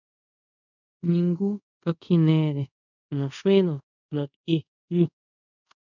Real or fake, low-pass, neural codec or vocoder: fake; 7.2 kHz; codec, 24 kHz, 0.5 kbps, DualCodec